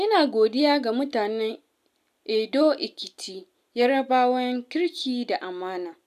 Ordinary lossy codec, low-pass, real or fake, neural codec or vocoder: none; 14.4 kHz; real; none